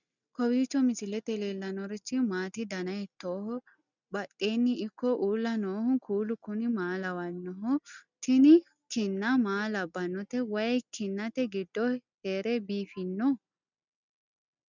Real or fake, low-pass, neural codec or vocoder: real; 7.2 kHz; none